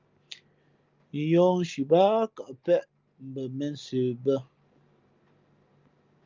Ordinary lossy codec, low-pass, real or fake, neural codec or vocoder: Opus, 24 kbps; 7.2 kHz; real; none